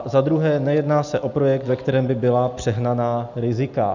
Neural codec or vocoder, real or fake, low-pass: none; real; 7.2 kHz